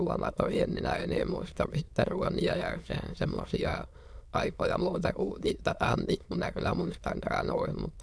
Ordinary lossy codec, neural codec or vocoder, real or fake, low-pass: none; autoencoder, 22.05 kHz, a latent of 192 numbers a frame, VITS, trained on many speakers; fake; none